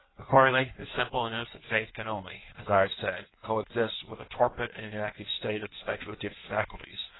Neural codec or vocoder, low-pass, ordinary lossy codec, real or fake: codec, 16 kHz in and 24 kHz out, 1.1 kbps, FireRedTTS-2 codec; 7.2 kHz; AAC, 16 kbps; fake